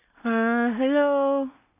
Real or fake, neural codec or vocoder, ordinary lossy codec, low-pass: fake; codec, 16 kHz in and 24 kHz out, 0.4 kbps, LongCat-Audio-Codec, two codebook decoder; none; 3.6 kHz